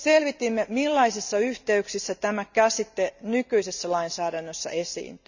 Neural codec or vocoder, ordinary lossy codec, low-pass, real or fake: none; none; 7.2 kHz; real